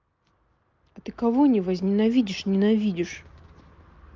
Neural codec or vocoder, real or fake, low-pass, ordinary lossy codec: none; real; 7.2 kHz; Opus, 24 kbps